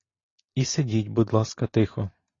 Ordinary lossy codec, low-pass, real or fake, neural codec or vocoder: AAC, 32 kbps; 7.2 kHz; real; none